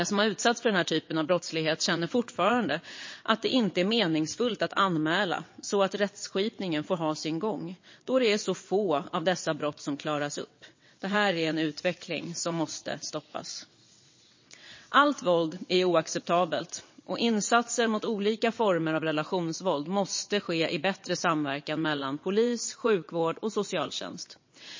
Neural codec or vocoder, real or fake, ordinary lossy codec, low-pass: vocoder, 22.05 kHz, 80 mel bands, WaveNeXt; fake; MP3, 32 kbps; 7.2 kHz